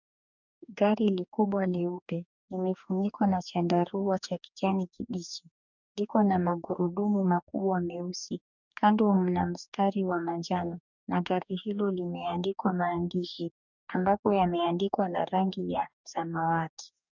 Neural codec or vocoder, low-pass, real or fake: codec, 44.1 kHz, 2.6 kbps, DAC; 7.2 kHz; fake